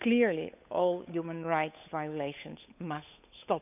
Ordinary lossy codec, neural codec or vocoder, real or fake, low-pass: none; codec, 16 kHz, 8 kbps, FunCodec, trained on Chinese and English, 25 frames a second; fake; 3.6 kHz